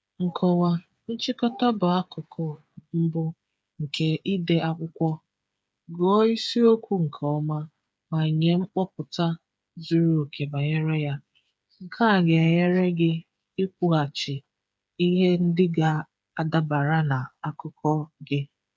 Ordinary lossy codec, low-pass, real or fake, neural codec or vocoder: none; none; fake; codec, 16 kHz, 8 kbps, FreqCodec, smaller model